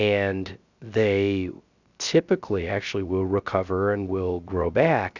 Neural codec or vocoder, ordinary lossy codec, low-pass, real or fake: codec, 16 kHz, 0.3 kbps, FocalCodec; Opus, 64 kbps; 7.2 kHz; fake